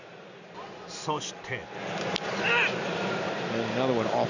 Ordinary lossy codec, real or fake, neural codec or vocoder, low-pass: none; real; none; 7.2 kHz